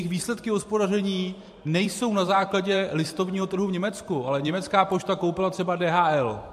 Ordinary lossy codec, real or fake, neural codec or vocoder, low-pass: MP3, 64 kbps; fake; vocoder, 44.1 kHz, 128 mel bands every 512 samples, BigVGAN v2; 14.4 kHz